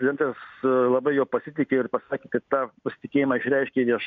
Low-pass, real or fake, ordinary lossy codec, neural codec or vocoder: 7.2 kHz; real; MP3, 48 kbps; none